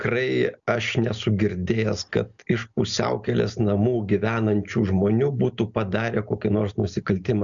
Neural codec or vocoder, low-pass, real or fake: none; 7.2 kHz; real